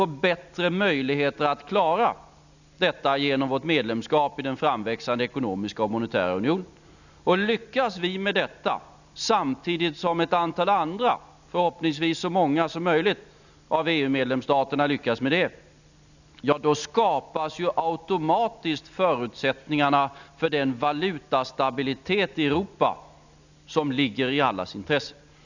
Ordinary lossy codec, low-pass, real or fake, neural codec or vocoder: none; 7.2 kHz; real; none